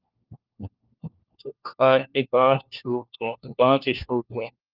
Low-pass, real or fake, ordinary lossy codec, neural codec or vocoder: 5.4 kHz; fake; Opus, 24 kbps; codec, 16 kHz, 1 kbps, FunCodec, trained on LibriTTS, 50 frames a second